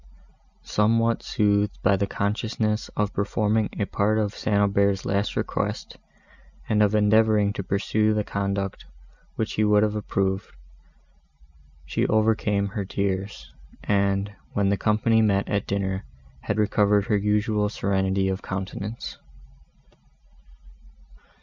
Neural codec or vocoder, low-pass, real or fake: none; 7.2 kHz; real